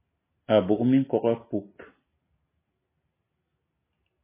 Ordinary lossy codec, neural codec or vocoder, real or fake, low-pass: MP3, 16 kbps; none; real; 3.6 kHz